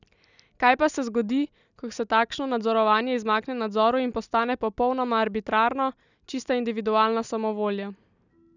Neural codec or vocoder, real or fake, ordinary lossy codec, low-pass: none; real; none; 7.2 kHz